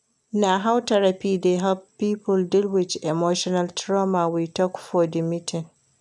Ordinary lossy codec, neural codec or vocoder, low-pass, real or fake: none; none; none; real